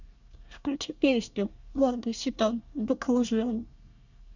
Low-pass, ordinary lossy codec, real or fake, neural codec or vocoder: 7.2 kHz; none; fake; codec, 24 kHz, 1 kbps, SNAC